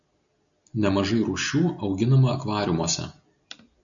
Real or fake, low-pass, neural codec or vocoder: real; 7.2 kHz; none